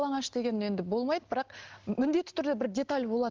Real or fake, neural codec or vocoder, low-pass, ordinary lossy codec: real; none; 7.2 kHz; Opus, 16 kbps